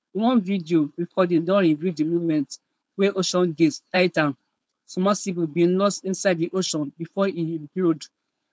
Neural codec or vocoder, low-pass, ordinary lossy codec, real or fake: codec, 16 kHz, 4.8 kbps, FACodec; none; none; fake